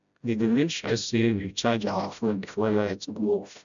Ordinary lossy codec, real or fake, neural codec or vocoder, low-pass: none; fake; codec, 16 kHz, 0.5 kbps, FreqCodec, smaller model; 7.2 kHz